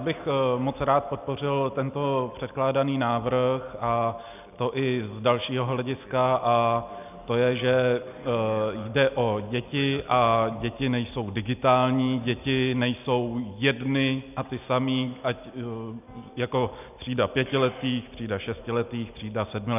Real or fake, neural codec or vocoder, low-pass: real; none; 3.6 kHz